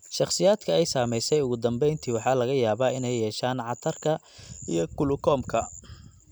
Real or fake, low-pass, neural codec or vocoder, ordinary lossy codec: real; none; none; none